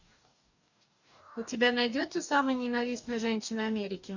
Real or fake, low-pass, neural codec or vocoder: fake; 7.2 kHz; codec, 44.1 kHz, 2.6 kbps, DAC